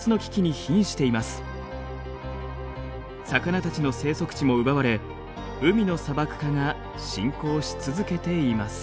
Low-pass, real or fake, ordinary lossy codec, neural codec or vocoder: none; real; none; none